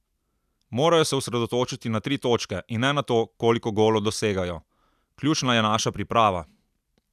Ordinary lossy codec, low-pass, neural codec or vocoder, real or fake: none; 14.4 kHz; none; real